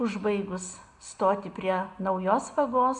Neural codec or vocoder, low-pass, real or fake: none; 10.8 kHz; real